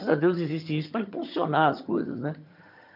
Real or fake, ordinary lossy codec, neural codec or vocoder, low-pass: fake; none; vocoder, 22.05 kHz, 80 mel bands, HiFi-GAN; 5.4 kHz